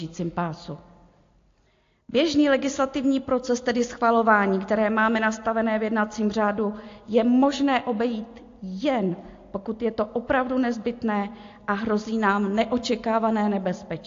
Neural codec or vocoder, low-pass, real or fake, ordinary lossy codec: none; 7.2 kHz; real; AAC, 48 kbps